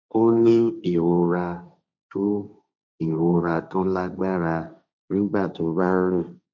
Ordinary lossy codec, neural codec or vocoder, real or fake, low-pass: none; codec, 16 kHz, 1.1 kbps, Voila-Tokenizer; fake; none